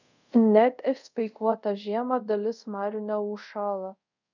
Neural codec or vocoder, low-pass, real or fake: codec, 24 kHz, 0.5 kbps, DualCodec; 7.2 kHz; fake